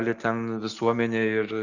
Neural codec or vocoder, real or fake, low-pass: none; real; 7.2 kHz